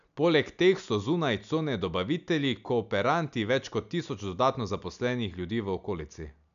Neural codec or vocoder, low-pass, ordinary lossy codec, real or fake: none; 7.2 kHz; none; real